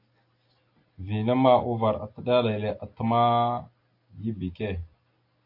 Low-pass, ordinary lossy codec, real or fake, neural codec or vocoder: 5.4 kHz; AAC, 32 kbps; real; none